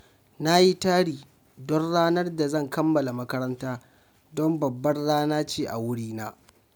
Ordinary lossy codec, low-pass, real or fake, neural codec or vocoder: none; none; real; none